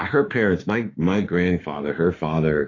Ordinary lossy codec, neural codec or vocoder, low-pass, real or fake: AAC, 32 kbps; autoencoder, 48 kHz, 32 numbers a frame, DAC-VAE, trained on Japanese speech; 7.2 kHz; fake